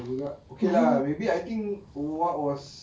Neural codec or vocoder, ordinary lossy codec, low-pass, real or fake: none; none; none; real